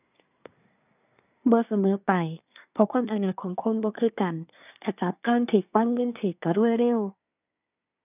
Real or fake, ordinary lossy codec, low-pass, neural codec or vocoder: fake; none; 3.6 kHz; codec, 24 kHz, 1 kbps, SNAC